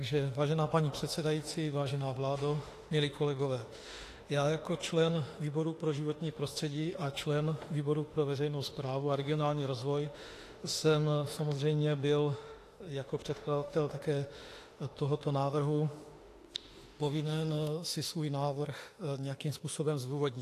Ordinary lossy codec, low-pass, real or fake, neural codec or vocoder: AAC, 48 kbps; 14.4 kHz; fake; autoencoder, 48 kHz, 32 numbers a frame, DAC-VAE, trained on Japanese speech